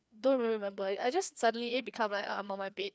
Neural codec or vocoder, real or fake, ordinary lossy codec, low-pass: codec, 16 kHz, 2 kbps, FreqCodec, larger model; fake; none; none